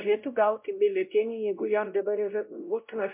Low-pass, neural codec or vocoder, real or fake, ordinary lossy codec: 3.6 kHz; codec, 16 kHz, 0.5 kbps, X-Codec, WavLM features, trained on Multilingual LibriSpeech; fake; MP3, 32 kbps